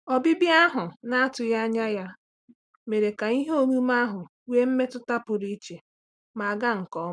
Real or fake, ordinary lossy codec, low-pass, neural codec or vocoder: real; none; 9.9 kHz; none